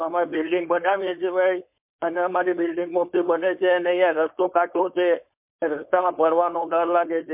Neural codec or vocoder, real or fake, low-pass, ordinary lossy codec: codec, 16 kHz, 4.8 kbps, FACodec; fake; 3.6 kHz; MP3, 32 kbps